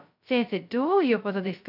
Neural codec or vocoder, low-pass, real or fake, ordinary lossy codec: codec, 16 kHz, 0.2 kbps, FocalCodec; 5.4 kHz; fake; none